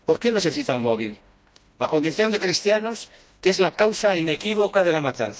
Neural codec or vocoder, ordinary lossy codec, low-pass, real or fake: codec, 16 kHz, 1 kbps, FreqCodec, smaller model; none; none; fake